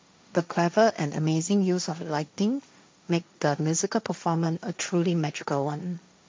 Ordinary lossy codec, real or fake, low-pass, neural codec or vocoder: none; fake; none; codec, 16 kHz, 1.1 kbps, Voila-Tokenizer